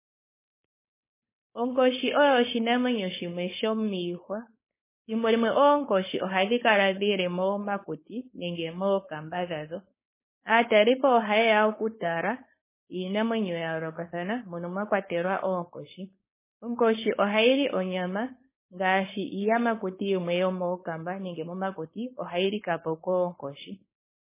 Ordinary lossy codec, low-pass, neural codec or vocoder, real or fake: MP3, 16 kbps; 3.6 kHz; codec, 16 kHz, 4.8 kbps, FACodec; fake